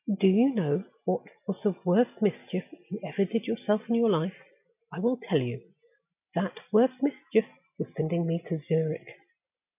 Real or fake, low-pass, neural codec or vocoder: real; 3.6 kHz; none